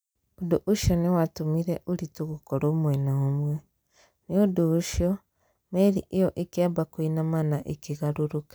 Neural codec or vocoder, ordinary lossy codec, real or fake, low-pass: none; none; real; none